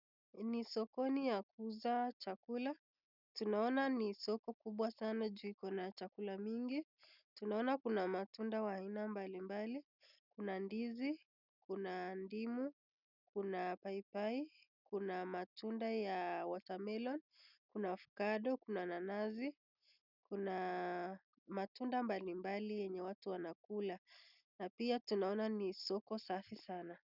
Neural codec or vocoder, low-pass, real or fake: none; 5.4 kHz; real